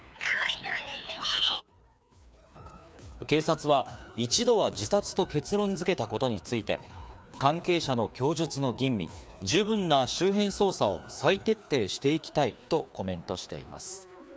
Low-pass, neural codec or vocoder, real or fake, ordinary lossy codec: none; codec, 16 kHz, 2 kbps, FreqCodec, larger model; fake; none